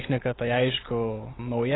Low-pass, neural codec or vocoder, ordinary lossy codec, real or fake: 7.2 kHz; none; AAC, 16 kbps; real